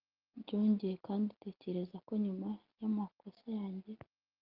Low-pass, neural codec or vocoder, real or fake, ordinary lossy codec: 5.4 kHz; none; real; Opus, 24 kbps